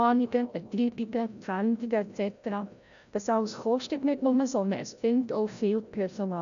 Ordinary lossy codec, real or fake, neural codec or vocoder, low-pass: none; fake; codec, 16 kHz, 0.5 kbps, FreqCodec, larger model; 7.2 kHz